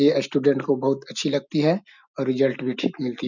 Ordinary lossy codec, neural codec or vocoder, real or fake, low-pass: none; none; real; 7.2 kHz